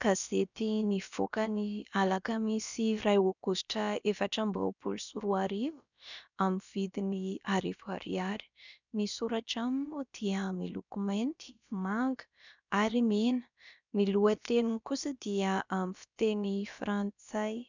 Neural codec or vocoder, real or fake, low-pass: codec, 16 kHz, about 1 kbps, DyCAST, with the encoder's durations; fake; 7.2 kHz